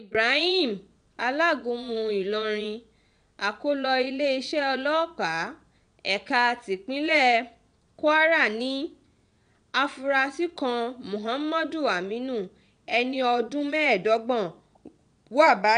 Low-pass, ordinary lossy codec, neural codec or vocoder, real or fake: 9.9 kHz; AAC, 96 kbps; vocoder, 22.05 kHz, 80 mel bands, Vocos; fake